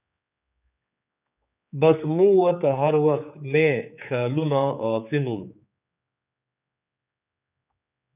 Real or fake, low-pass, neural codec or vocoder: fake; 3.6 kHz; codec, 16 kHz, 4 kbps, X-Codec, HuBERT features, trained on general audio